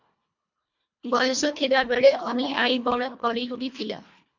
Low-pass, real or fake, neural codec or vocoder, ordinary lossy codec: 7.2 kHz; fake; codec, 24 kHz, 1.5 kbps, HILCodec; MP3, 48 kbps